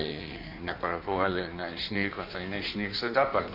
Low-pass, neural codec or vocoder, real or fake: 5.4 kHz; codec, 16 kHz in and 24 kHz out, 1.1 kbps, FireRedTTS-2 codec; fake